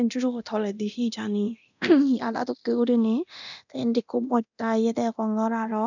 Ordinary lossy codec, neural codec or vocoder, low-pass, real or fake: none; codec, 24 kHz, 0.9 kbps, DualCodec; 7.2 kHz; fake